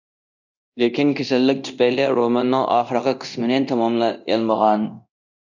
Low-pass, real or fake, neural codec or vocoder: 7.2 kHz; fake; codec, 24 kHz, 0.9 kbps, DualCodec